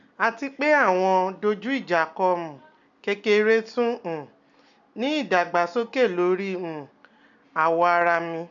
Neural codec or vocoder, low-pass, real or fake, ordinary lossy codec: none; 7.2 kHz; real; none